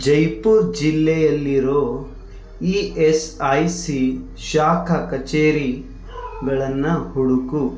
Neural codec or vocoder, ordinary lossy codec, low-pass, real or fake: none; none; none; real